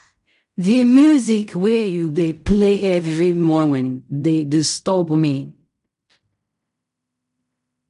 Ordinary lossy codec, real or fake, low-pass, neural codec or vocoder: none; fake; 10.8 kHz; codec, 16 kHz in and 24 kHz out, 0.4 kbps, LongCat-Audio-Codec, fine tuned four codebook decoder